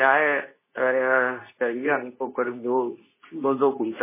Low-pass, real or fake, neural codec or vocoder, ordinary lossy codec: 3.6 kHz; fake; codec, 24 kHz, 0.9 kbps, WavTokenizer, medium speech release version 2; MP3, 16 kbps